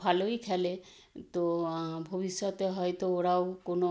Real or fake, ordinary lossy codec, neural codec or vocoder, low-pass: real; none; none; none